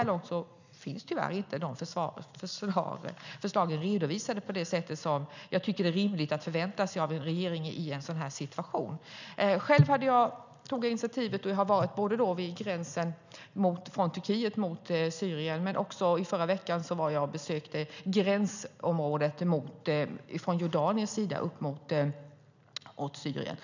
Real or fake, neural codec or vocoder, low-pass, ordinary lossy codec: real; none; 7.2 kHz; none